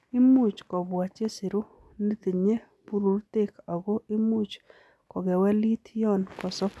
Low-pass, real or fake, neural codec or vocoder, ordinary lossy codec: none; real; none; none